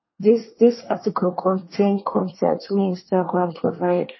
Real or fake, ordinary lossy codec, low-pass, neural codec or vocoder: fake; MP3, 24 kbps; 7.2 kHz; codec, 24 kHz, 1 kbps, SNAC